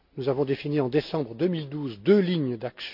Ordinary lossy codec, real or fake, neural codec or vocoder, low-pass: none; real; none; 5.4 kHz